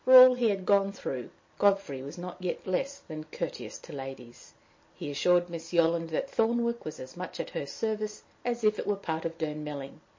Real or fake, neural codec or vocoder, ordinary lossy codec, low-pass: real; none; MP3, 32 kbps; 7.2 kHz